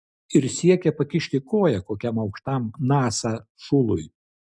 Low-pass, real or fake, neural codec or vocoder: 9.9 kHz; real; none